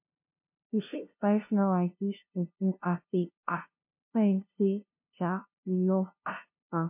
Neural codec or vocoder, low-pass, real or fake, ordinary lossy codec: codec, 16 kHz, 0.5 kbps, FunCodec, trained on LibriTTS, 25 frames a second; 3.6 kHz; fake; MP3, 32 kbps